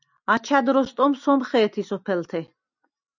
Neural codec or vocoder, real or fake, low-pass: none; real; 7.2 kHz